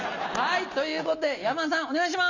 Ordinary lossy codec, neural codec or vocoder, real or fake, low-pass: none; none; real; 7.2 kHz